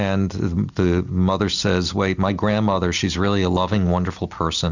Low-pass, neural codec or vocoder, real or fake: 7.2 kHz; none; real